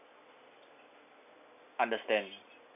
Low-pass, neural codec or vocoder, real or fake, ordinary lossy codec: 3.6 kHz; none; real; none